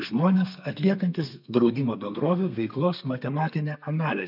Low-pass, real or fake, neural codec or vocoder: 5.4 kHz; fake; codec, 32 kHz, 1.9 kbps, SNAC